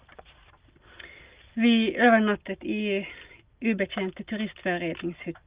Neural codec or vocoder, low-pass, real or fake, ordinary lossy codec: none; 3.6 kHz; real; Opus, 32 kbps